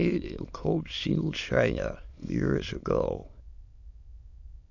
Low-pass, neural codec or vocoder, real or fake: 7.2 kHz; autoencoder, 22.05 kHz, a latent of 192 numbers a frame, VITS, trained on many speakers; fake